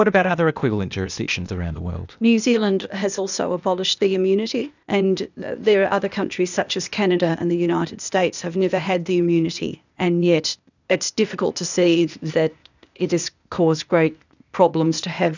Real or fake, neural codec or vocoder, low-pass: fake; codec, 16 kHz, 0.8 kbps, ZipCodec; 7.2 kHz